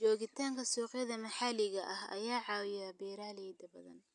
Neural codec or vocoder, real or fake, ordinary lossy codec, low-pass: none; real; none; 10.8 kHz